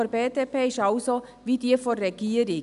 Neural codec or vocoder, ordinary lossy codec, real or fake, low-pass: none; none; real; 10.8 kHz